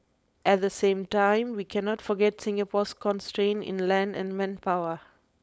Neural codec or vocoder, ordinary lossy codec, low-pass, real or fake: codec, 16 kHz, 4.8 kbps, FACodec; none; none; fake